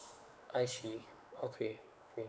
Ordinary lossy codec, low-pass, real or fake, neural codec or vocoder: none; none; fake; codec, 16 kHz, 4 kbps, X-Codec, WavLM features, trained on Multilingual LibriSpeech